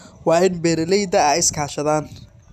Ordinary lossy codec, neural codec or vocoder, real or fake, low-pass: none; none; real; 14.4 kHz